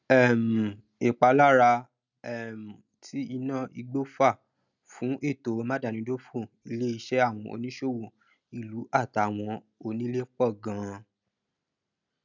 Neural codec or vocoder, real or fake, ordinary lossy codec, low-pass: none; real; none; 7.2 kHz